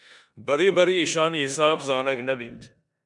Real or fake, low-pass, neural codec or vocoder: fake; 10.8 kHz; codec, 16 kHz in and 24 kHz out, 0.9 kbps, LongCat-Audio-Codec, four codebook decoder